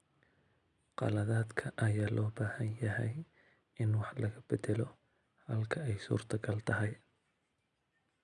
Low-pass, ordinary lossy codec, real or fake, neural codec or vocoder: 10.8 kHz; none; real; none